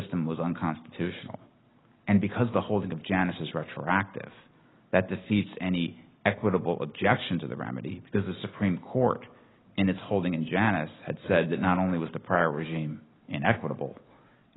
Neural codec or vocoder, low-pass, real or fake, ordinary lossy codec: none; 7.2 kHz; real; AAC, 16 kbps